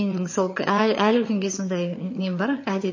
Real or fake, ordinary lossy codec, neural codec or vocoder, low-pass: fake; MP3, 32 kbps; vocoder, 22.05 kHz, 80 mel bands, HiFi-GAN; 7.2 kHz